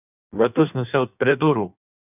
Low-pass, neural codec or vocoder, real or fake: 3.6 kHz; codec, 16 kHz in and 24 kHz out, 1.1 kbps, FireRedTTS-2 codec; fake